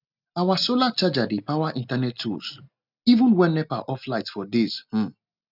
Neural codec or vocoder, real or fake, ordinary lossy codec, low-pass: none; real; none; 5.4 kHz